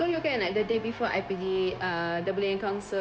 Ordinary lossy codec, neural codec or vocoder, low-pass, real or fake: none; codec, 16 kHz, 0.9 kbps, LongCat-Audio-Codec; none; fake